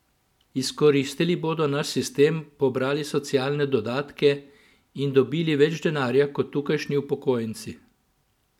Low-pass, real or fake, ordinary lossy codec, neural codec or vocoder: 19.8 kHz; real; none; none